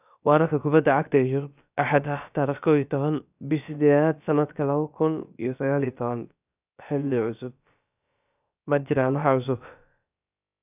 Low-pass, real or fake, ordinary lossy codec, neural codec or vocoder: 3.6 kHz; fake; none; codec, 16 kHz, about 1 kbps, DyCAST, with the encoder's durations